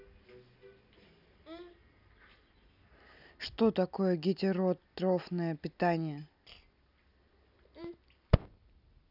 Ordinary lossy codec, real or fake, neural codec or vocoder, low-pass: none; real; none; 5.4 kHz